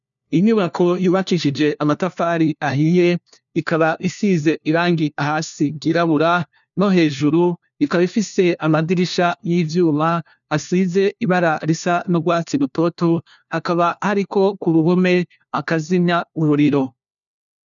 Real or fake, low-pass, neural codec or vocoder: fake; 7.2 kHz; codec, 16 kHz, 1 kbps, FunCodec, trained on LibriTTS, 50 frames a second